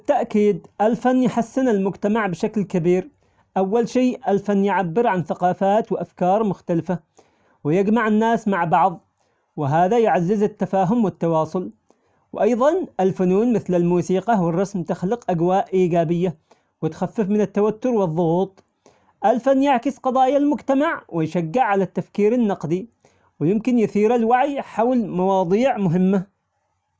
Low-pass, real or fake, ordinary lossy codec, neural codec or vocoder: none; real; none; none